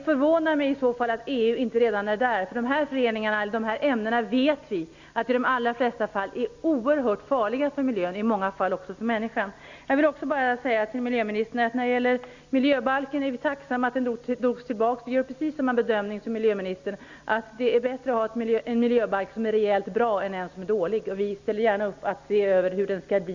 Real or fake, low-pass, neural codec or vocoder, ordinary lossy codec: real; 7.2 kHz; none; Opus, 64 kbps